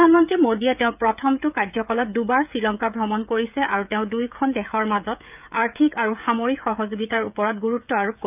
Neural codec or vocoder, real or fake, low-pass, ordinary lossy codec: codec, 16 kHz, 16 kbps, FreqCodec, smaller model; fake; 3.6 kHz; none